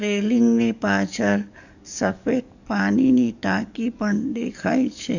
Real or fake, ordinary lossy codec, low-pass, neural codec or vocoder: fake; none; 7.2 kHz; codec, 44.1 kHz, 7.8 kbps, DAC